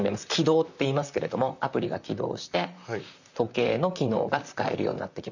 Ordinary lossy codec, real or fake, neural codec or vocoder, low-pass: none; fake; vocoder, 44.1 kHz, 128 mel bands, Pupu-Vocoder; 7.2 kHz